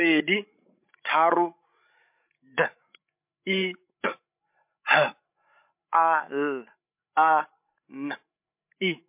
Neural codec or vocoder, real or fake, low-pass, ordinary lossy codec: codec, 16 kHz, 16 kbps, FreqCodec, larger model; fake; 3.6 kHz; MP3, 32 kbps